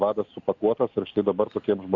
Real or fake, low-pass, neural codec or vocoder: real; 7.2 kHz; none